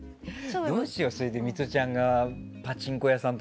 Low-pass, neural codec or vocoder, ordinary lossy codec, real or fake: none; none; none; real